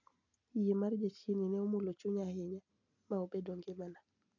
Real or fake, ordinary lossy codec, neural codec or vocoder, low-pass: real; none; none; 7.2 kHz